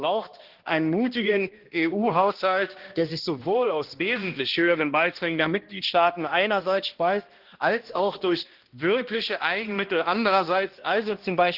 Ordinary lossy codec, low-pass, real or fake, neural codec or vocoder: Opus, 16 kbps; 5.4 kHz; fake; codec, 16 kHz, 1 kbps, X-Codec, HuBERT features, trained on balanced general audio